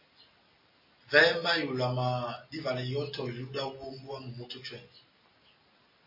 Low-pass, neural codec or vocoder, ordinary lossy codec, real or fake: 5.4 kHz; none; MP3, 24 kbps; real